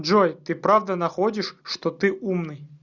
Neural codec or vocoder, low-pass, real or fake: none; 7.2 kHz; real